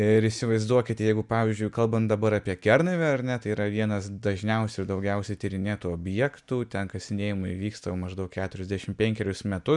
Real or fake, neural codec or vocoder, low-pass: real; none; 10.8 kHz